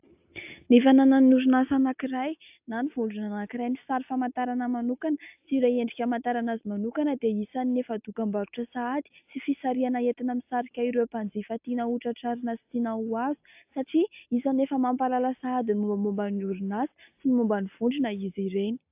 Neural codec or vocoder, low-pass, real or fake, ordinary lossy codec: none; 3.6 kHz; real; AAC, 32 kbps